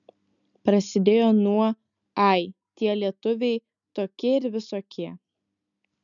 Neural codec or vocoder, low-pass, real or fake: none; 7.2 kHz; real